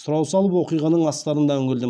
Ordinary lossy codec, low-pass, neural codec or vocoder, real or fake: none; none; none; real